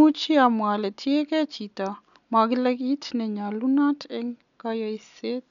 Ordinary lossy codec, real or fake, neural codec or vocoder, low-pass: none; real; none; 7.2 kHz